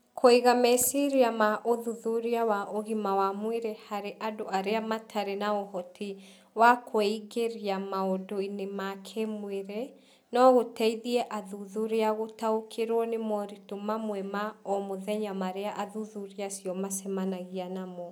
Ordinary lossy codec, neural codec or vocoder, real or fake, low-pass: none; none; real; none